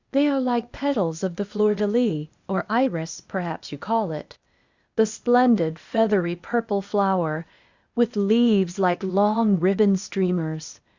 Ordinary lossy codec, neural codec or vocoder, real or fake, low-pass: Opus, 64 kbps; codec, 16 kHz, 0.8 kbps, ZipCodec; fake; 7.2 kHz